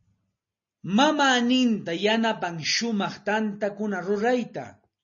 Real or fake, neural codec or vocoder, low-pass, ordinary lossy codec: real; none; 7.2 kHz; MP3, 32 kbps